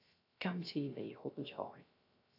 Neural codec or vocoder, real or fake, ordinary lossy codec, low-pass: codec, 16 kHz, 0.3 kbps, FocalCodec; fake; AAC, 48 kbps; 5.4 kHz